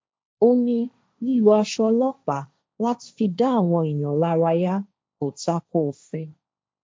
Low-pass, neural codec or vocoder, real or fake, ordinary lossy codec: none; codec, 16 kHz, 1.1 kbps, Voila-Tokenizer; fake; none